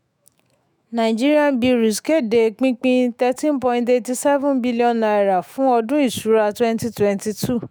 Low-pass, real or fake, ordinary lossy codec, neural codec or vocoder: none; fake; none; autoencoder, 48 kHz, 128 numbers a frame, DAC-VAE, trained on Japanese speech